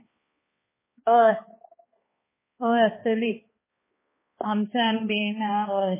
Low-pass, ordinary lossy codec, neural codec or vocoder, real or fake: 3.6 kHz; MP3, 16 kbps; codec, 16 kHz, 2 kbps, X-Codec, HuBERT features, trained on balanced general audio; fake